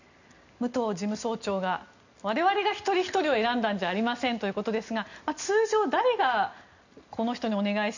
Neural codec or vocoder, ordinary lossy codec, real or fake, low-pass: none; none; real; 7.2 kHz